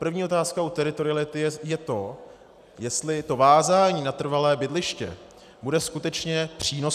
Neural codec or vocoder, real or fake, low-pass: none; real; 14.4 kHz